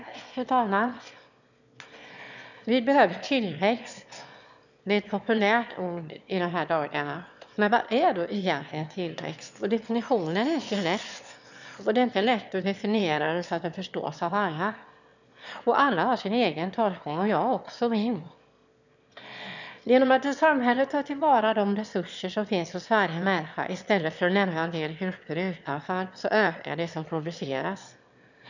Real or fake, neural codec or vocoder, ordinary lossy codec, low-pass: fake; autoencoder, 22.05 kHz, a latent of 192 numbers a frame, VITS, trained on one speaker; none; 7.2 kHz